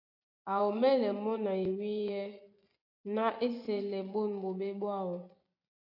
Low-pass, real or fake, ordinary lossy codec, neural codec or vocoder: 5.4 kHz; fake; AAC, 32 kbps; vocoder, 24 kHz, 100 mel bands, Vocos